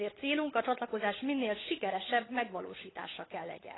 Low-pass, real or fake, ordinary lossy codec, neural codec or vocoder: 7.2 kHz; fake; AAC, 16 kbps; codec, 16 kHz, 16 kbps, FunCodec, trained on LibriTTS, 50 frames a second